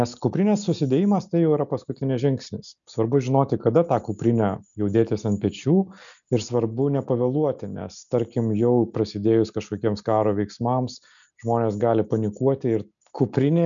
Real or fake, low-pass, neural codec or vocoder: real; 7.2 kHz; none